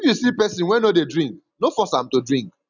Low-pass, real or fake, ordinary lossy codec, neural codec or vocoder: 7.2 kHz; real; none; none